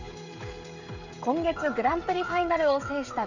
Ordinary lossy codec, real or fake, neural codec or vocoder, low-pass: none; fake; codec, 16 kHz, 16 kbps, FreqCodec, smaller model; 7.2 kHz